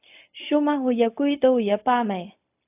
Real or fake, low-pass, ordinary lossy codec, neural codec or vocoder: fake; 3.6 kHz; AAC, 32 kbps; codec, 16 kHz, 0.4 kbps, LongCat-Audio-Codec